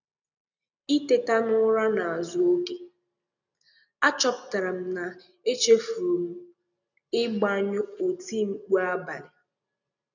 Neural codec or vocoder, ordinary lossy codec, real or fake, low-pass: none; none; real; 7.2 kHz